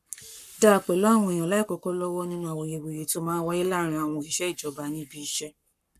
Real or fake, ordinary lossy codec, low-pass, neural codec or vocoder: fake; none; 14.4 kHz; codec, 44.1 kHz, 7.8 kbps, Pupu-Codec